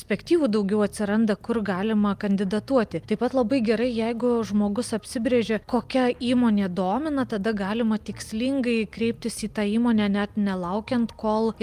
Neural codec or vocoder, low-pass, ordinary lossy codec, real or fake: none; 14.4 kHz; Opus, 32 kbps; real